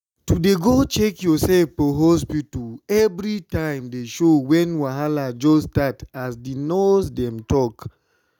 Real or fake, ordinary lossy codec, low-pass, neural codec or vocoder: real; none; 19.8 kHz; none